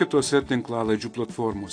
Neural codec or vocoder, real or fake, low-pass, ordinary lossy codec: none; real; 9.9 kHz; AAC, 48 kbps